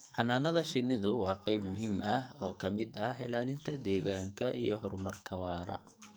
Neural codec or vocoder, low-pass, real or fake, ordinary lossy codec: codec, 44.1 kHz, 2.6 kbps, SNAC; none; fake; none